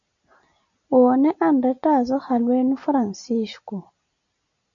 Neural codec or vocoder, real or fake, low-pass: none; real; 7.2 kHz